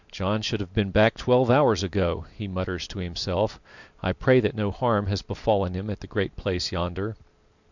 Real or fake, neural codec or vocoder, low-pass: real; none; 7.2 kHz